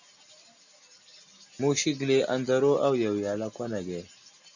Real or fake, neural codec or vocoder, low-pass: real; none; 7.2 kHz